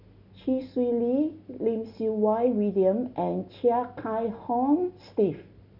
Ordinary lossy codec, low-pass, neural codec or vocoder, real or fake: none; 5.4 kHz; none; real